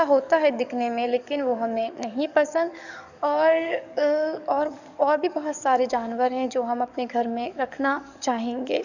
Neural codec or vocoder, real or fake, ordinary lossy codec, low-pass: codec, 44.1 kHz, 7.8 kbps, DAC; fake; none; 7.2 kHz